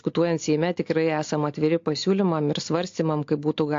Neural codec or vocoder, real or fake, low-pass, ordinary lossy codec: none; real; 7.2 kHz; AAC, 48 kbps